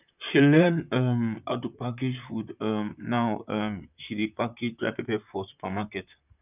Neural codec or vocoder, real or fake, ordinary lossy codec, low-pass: vocoder, 44.1 kHz, 128 mel bands, Pupu-Vocoder; fake; none; 3.6 kHz